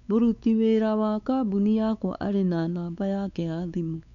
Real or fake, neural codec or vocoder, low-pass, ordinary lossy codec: fake; codec, 16 kHz, 4 kbps, X-Codec, WavLM features, trained on Multilingual LibriSpeech; 7.2 kHz; none